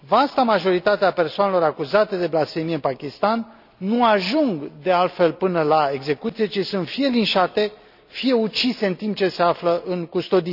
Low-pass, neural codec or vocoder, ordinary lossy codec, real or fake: 5.4 kHz; none; none; real